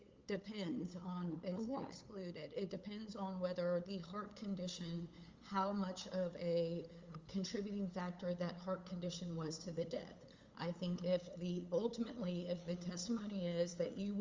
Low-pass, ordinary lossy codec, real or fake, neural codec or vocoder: 7.2 kHz; Opus, 32 kbps; fake; codec, 16 kHz, 8 kbps, FunCodec, trained on LibriTTS, 25 frames a second